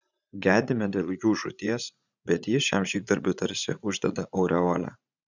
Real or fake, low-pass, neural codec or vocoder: fake; 7.2 kHz; vocoder, 44.1 kHz, 80 mel bands, Vocos